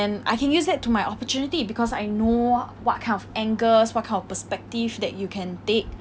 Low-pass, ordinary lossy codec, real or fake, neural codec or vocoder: none; none; real; none